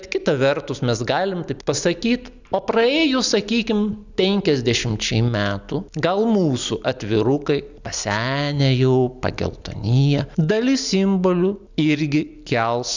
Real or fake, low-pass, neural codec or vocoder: real; 7.2 kHz; none